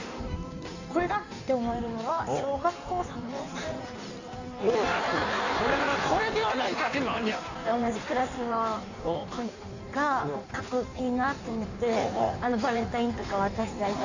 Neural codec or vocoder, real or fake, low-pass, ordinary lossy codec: codec, 16 kHz in and 24 kHz out, 1.1 kbps, FireRedTTS-2 codec; fake; 7.2 kHz; none